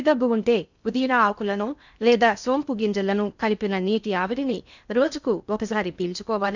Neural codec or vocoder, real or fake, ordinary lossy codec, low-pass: codec, 16 kHz in and 24 kHz out, 0.6 kbps, FocalCodec, streaming, 2048 codes; fake; none; 7.2 kHz